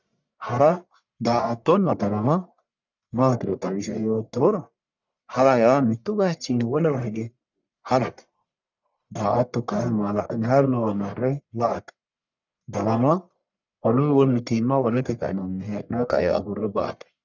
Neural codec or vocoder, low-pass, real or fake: codec, 44.1 kHz, 1.7 kbps, Pupu-Codec; 7.2 kHz; fake